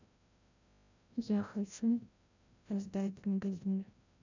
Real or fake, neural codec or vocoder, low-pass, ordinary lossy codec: fake; codec, 16 kHz, 0.5 kbps, FreqCodec, larger model; 7.2 kHz; none